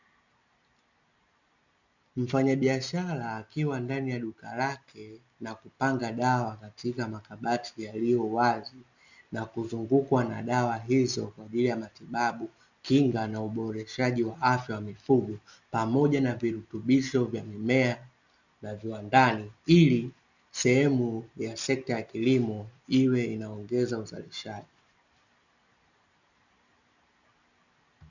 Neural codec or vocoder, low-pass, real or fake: none; 7.2 kHz; real